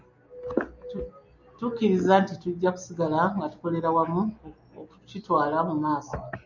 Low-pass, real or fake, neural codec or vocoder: 7.2 kHz; real; none